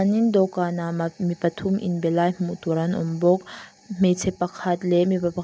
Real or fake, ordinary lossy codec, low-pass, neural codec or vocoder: real; none; none; none